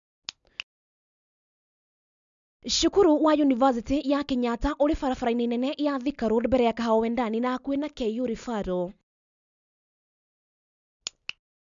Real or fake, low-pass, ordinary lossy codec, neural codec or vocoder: real; 7.2 kHz; none; none